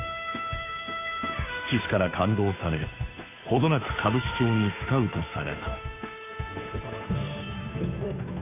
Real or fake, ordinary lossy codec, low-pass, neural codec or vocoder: fake; AAC, 24 kbps; 3.6 kHz; codec, 16 kHz, 2 kbps, FunCodec, trained on Chinese and English, 25 frames a second